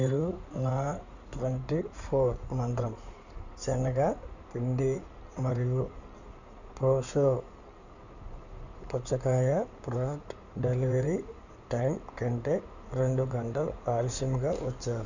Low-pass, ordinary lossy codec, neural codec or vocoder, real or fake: 7.2 kHz; none; codec, 16 kHz in and 24 kHz out, 2.2 kbps, FireRedTTS-2 codec; fake